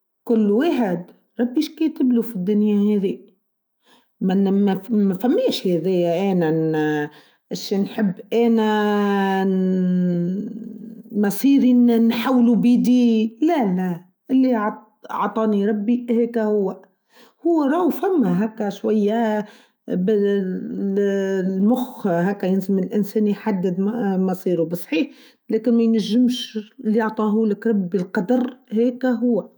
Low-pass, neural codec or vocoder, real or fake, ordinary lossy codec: none; autoencoder, 48 kHz, 128 numbers a frame, DAC-VAE, trained on Japanese speech; fake; none